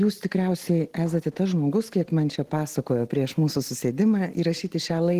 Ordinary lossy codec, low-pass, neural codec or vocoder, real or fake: Opus, 16 kbps; 14.4 kHz; none; real